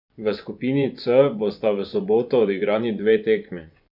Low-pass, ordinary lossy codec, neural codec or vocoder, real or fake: 5.4 kHz; none; vocoder, 24 kHz, 100 mel bands, Vocos; fake